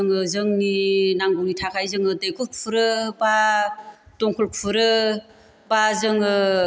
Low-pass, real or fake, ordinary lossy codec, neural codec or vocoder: none; real; none; none